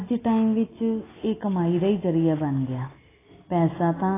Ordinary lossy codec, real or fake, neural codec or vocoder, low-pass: AAC, 16 kbps; real; none; 3.6 kHz